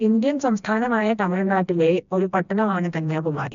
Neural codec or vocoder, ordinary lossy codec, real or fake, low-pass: codec, 16 kHz, 1 kbps, FreqCodec, smaller model; none; fake; 7.2 kHz